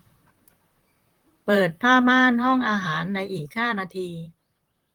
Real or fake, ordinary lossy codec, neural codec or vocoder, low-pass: fake; Opus, 24 kbps; vocoder, 44.1 kHz, 128 mel bands, Pupu-Vocoder; 19.8 kHz